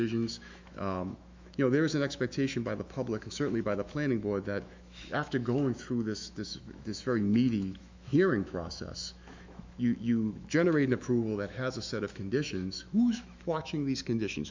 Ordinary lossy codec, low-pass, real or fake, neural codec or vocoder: MP3, 64 kbps; 7.2 kHz; fake; autoencoder, 48 kHz, 128 numbers a frame, DAC-VAE, trained on Japanese speech